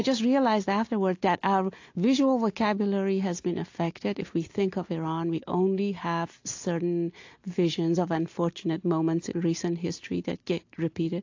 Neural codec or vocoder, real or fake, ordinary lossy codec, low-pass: none; real; AAC, 48 kbps; 7.2 kHz